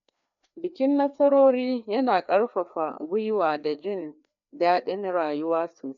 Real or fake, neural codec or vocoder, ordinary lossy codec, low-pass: fake; codec, 16 kHz, 2 kbps, FreqCodec, larger model; none; 7.2 kHz